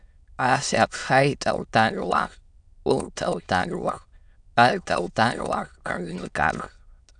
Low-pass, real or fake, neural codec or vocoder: 9.9 kHz; fake; autoencoder, 22.05 kHz, a latent of 192 numbers a frame, VITS, trained on many speakers